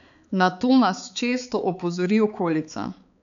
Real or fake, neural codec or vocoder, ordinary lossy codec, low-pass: fake; codec, 16 kHz, 4 kbps, X-Codec, HuBERT features, trained on balanced general audio; none; 7.2 kHz